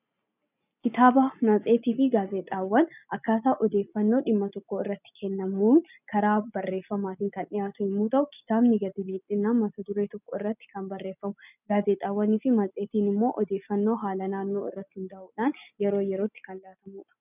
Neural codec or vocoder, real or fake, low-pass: none; real; 3.6 kHz